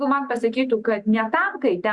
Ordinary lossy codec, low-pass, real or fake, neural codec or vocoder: Opus, 64 kbps; 10.8 kHz; real; none